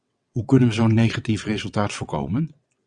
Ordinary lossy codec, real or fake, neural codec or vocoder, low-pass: Opus, 64 kbps; fake; vocoder, 22.05 kHz, 80 mel bands, WaveNeXt; 9.9 kHz